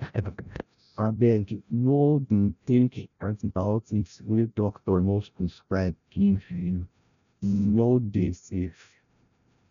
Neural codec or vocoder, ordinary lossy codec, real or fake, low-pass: codec, 16 kHz, 0.5 kbps, FreqCodec, larger model; none; fake; 7.2 kHz